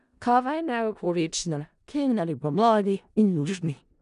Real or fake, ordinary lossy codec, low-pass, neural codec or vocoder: fake; none; 10.8 kHz; codec, 16 kHz in and 24 kHz out, 0.4 kbps, LongCat-Audio-Codec, four codebook decoder